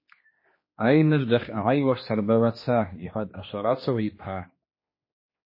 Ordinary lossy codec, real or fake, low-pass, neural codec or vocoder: MP3, 24 kbps; fake; 5.4 kHz; codec, 16 kHz, 2 kbps, X-Codec, HuBERT features, trained on general audio